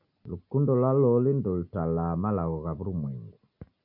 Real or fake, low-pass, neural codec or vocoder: real; 5.4 kHz; none